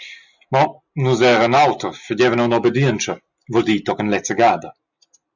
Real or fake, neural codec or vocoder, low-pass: real; none; 7.2 kHz